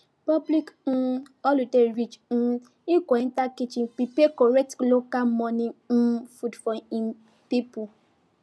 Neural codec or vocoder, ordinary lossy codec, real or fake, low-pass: none; none; real; none